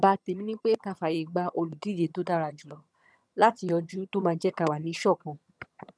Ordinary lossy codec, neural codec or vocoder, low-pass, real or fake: none; vocoder, 22.05 kHz, 80 mel bands, HiFi-GAN; none; fake